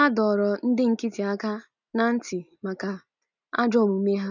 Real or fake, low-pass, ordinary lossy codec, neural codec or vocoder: real; 7.2 kHz; none; none